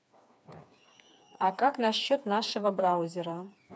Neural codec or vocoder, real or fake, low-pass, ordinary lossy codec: codec, 16 kHz, 4 kbps, FreqCodec, smaller model; fake; none; none